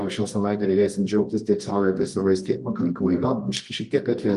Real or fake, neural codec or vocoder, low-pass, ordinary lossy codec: fake; codec, 24 kHz, 0.9 kbps, WavTokenizer, medium music audio release; 10.8 kHz; Opus, 24 kbps